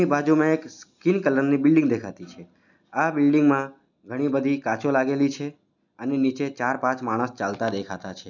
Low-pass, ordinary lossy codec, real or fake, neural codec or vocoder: 7.2 kHz; none; real; none